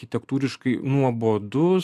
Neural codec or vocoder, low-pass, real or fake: none; 14.4 kHz; real